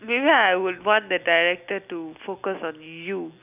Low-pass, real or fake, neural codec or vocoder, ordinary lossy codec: 3.6 kHz; real; none; none